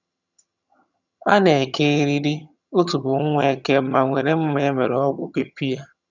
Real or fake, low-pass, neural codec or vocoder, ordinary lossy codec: fake; 7.2 kHz; vocoder, 22.05 kHz, 80 mel bands, HiFi-GAN; none